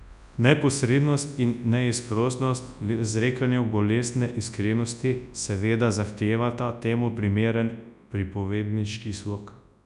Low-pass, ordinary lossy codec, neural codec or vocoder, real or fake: 10.8 kHz; none; codec, 24 kHz, 0.9 kbps, WavTokenizer, large speech release; fake